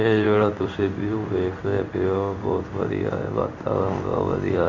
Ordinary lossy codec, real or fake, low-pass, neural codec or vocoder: none; fake; 7.2 kHz; codec, 16 kHz in and 24 kHz out, 1 kbps, XY-Tokenizer